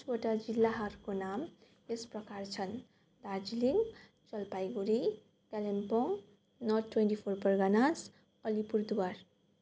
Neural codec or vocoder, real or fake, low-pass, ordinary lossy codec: none; real; none; none